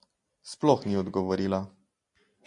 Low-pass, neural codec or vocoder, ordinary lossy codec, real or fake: 10.8 kHz; none; MP3, 48 kbps; real